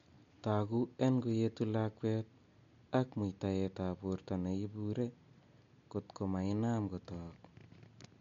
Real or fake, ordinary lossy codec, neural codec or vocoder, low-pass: real; MP3, 48 kbps; none; 7.2 kHz